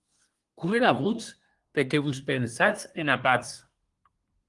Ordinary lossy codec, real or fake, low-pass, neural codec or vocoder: Opus, 24 kbps; fake; 10.8 kHz; codec, 24 kHz, 1 kbps, SNAC